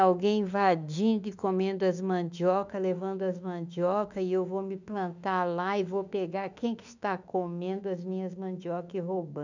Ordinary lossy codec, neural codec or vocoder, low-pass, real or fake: none; autoencoder, 48 kHz, 32 numbers a frame, DAC-VAE, trained on Japanese speech; 7.2 kHz; fake